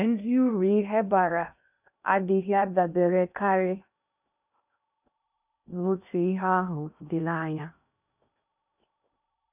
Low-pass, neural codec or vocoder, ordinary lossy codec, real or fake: 3.6 kHz; codec, 16 kHz in and 24 kHz out, 0.6 kbps, FocalCodec, streaming, 2048 codes; none; fake